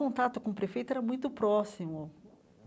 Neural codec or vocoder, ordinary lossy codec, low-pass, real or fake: none; none; none; real